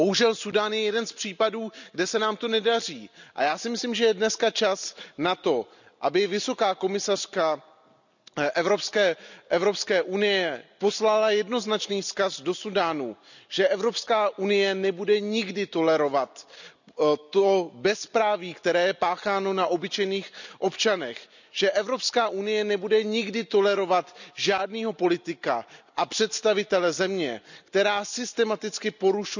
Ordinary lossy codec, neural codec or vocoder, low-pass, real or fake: none; none; 7.2 kHz; real